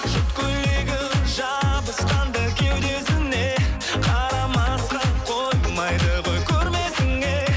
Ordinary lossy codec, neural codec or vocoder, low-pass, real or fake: none; none; none; real